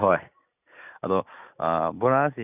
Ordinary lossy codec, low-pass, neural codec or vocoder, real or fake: none; 3.6 kHz; none; real